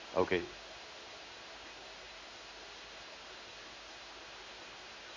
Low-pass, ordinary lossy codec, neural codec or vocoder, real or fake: 7.2 kHz; MP3, 48 kbps; codec, 16 kHz in and 24 kHz out, 1 kbps, XY-Tokenizer; fake